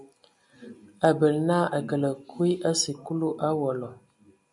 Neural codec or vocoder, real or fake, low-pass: none; real; 10.8 kHz